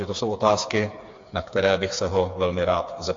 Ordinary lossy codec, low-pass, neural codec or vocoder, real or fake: AAC, 48 kbps; 7.2 kHz; codec, 16 kHz, 4 kbps, FreqCodec, smaller model; fake